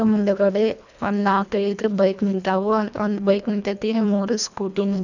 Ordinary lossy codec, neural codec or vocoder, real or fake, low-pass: none; codec, 24 kHz, 1.5 kbps, HILCodec; fake; 7.2 kHz